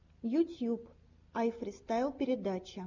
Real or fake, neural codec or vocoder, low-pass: real; none; 7.2 kHz